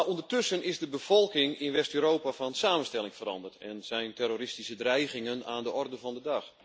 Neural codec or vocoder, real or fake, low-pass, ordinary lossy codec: none; real; none; none